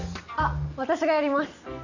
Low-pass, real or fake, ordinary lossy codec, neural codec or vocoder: 7.2 kHz; real; none; none